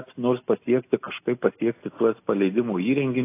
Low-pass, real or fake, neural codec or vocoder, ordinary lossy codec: 3.6 kHz; real; none; AAC, 24 kbps